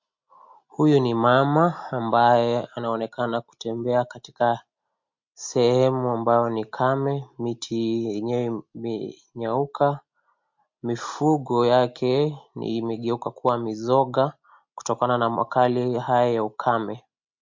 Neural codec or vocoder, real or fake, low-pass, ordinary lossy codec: none; real; 7.2 kHz; MP3, 48 kbps